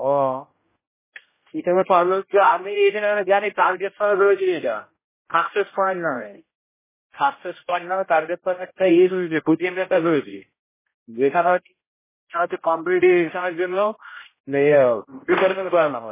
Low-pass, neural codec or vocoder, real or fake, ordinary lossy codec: 3.6 kHz; codec, 16 kHz, 0.5 kbps, X-Codec, HuBERT features, trained on general audio; fake; MP3, 16 kbps